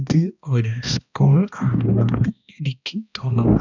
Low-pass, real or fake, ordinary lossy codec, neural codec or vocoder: 7.2 kHz; fake; none; codec, 16 kHz, 1 kbps, X-Codec, HuBERT features, trained on balanced general audio